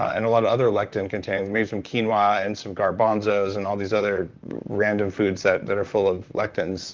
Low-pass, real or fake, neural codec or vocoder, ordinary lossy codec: 7.2 kHz; fake; vocoder, 44.1 kHz, 128 mel bands, Pupu-Vocoder; Opus, 24 kbps